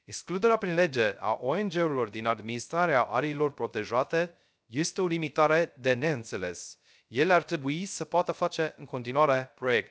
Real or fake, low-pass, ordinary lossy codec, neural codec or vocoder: fake; none; none; codec, 16 kHz, 0.3 kbps, FocalCodec